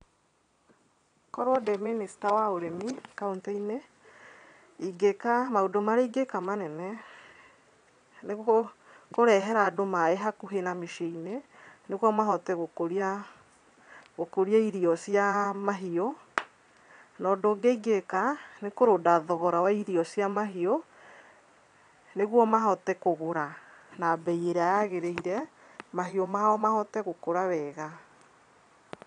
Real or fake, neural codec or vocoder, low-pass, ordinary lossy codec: fake; vocoder, 22.05 kHz, 80 mel bands, Vocos; 9.9 kHz; none